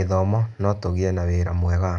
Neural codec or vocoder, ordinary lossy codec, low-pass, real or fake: none; none; 9.9 kHz; real